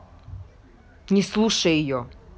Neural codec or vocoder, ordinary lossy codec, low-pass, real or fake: none; none; none; real